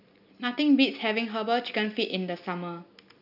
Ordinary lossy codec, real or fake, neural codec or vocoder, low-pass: none; real; none; 5.4 kHz